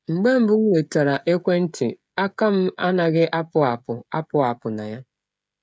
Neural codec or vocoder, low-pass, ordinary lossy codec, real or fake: codec, 16 kHz, 16 kbps, FreqCodec, smaller model; none; none; fake